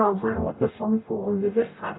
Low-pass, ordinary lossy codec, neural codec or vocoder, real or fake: 7.2 kHz; AAC, 16 kbps; codec, 44.1 kHz, 0.9 kbps, DAC; fake